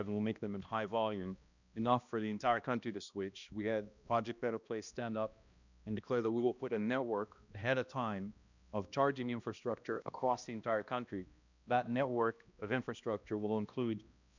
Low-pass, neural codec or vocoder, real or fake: 7.2 kHz; codec, 16 kHz, 1 kbps, X-Codec, HuBERT features, trained on balanced general audio; fake